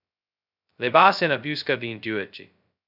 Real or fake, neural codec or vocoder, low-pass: fake; codec, 16 kHz, 0.2 kbps, FocalCodec; 5.4 kHz